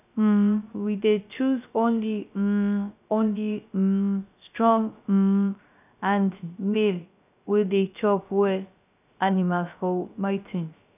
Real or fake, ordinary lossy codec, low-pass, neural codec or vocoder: fake; none; 3.6 kHz; codec, 16 kHz, 0.3 kbps, FocalCodec